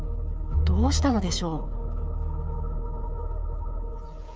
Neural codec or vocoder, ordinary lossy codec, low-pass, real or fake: codec, 16 kHz, 8 kbps, FreqCodec, smaller model; none; none; fake